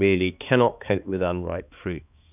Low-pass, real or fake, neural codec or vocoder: 3.6 kHz; fake; codec, 16 kHz, 2 kbps, X-Codec, HuBERT features, trained on balanced general audio